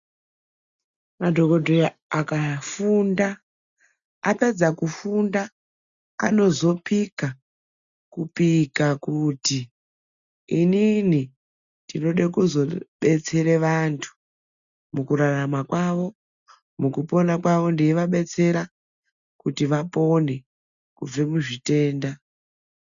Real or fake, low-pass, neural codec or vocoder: real; 7.2 kHz; none